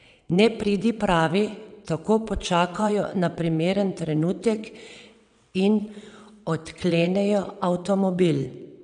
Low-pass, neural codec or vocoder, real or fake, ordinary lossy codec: 9.9 kHz; vocoder, 22.05 kHz, 80 mel bands, WaveNeXt; fake; none